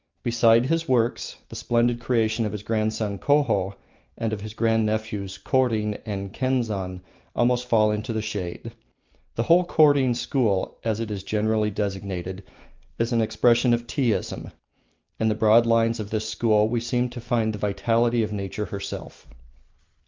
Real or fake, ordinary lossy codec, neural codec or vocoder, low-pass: real; Opus, 24 kbps; none; 7.2 kHz